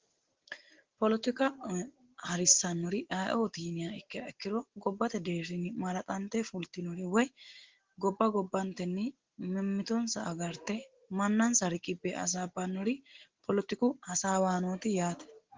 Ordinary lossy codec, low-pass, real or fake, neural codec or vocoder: Opus, 16 kbps; 7.2 kHz; real; none